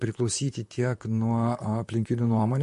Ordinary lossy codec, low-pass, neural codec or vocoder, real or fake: MP3, 48 kbps; 14.4 kHz; vocoder, 44.1 kHz, 128 mel bands, Pupu-Vocoder; fake